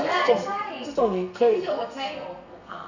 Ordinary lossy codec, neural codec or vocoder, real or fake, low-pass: none; codec, 32 kHz, 1.9 kbps, SNAC; fake; 7.2 kHz